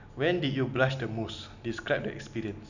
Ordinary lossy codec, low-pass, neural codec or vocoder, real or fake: none; 7.2 kHz; none; real